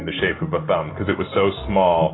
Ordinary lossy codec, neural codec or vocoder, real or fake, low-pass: AAC, 16 kbps; none; real; 7.2 kHz